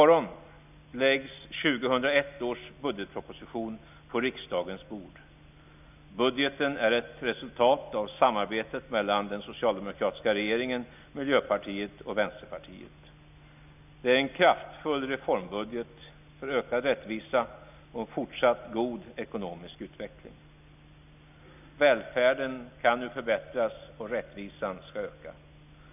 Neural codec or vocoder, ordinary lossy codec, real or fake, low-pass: none; none; real; 3.6 kHz